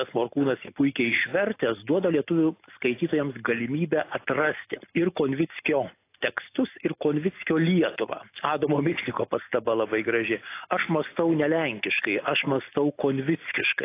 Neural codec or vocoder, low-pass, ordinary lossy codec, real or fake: none; 3.6 kHz; AAC, 24 kbps; real